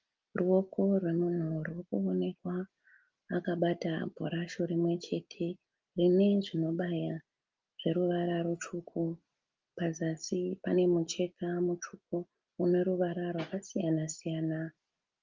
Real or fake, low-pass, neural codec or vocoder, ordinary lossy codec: real; 7.2 kHz; none; Opus, 32 kbps